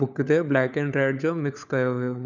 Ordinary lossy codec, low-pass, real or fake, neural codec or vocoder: none; 7.2 kHz; fake; codec, 16 kHz, 4 kbps, FunCodec, trained on LibriTTS, 50 frames a second